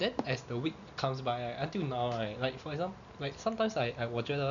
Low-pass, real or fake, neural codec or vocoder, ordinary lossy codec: 7.2 kHz; real; none; none